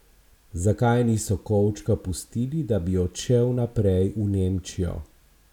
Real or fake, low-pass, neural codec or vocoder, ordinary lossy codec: real; 19.8 kHz; none; none